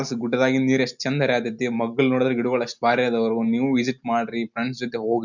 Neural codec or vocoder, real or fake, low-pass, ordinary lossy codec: none; real; 7.2 kHz; none